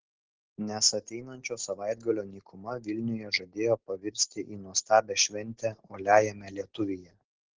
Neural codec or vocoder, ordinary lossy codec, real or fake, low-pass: none; Opus, 16 kbps; real; 7.2 kHz